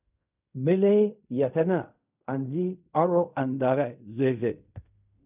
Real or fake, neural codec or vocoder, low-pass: fake; codec, 16 kHz in and 24 kHz out, 0.4 kbps, LongCat-Audio-Codec, fine tuned four codebook decoder; 3.6 kHz